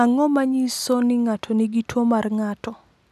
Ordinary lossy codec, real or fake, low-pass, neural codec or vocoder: none; real; 14.4 kHz; none